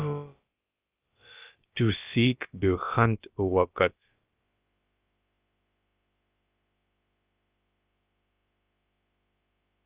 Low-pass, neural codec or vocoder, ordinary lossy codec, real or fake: 3.6 kHz; codec, 16 kHz, about 1 kbps, DyCAST, with the encoder's durations; Opus, 32 kbps; fake